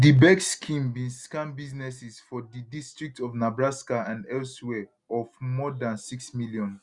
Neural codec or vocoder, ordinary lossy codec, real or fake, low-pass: none; Opus, 64 kbps; real; 10.8 kHz